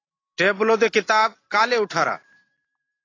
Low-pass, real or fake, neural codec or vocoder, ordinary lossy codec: 7.2 kHz; real; none; AAC, 32 kbps